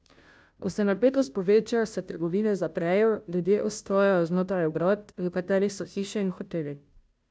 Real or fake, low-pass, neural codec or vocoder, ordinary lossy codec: fake; none; codec, 16 kHz, 0.5 kbps, FunCodec, trained on Chinese and English, 25 frames a second; none